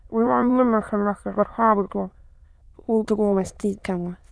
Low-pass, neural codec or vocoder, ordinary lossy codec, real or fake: none; autoencoder, 22.05 kHz, a latent of 192 numbers a frame, VITS, trained on many speakers; none; fake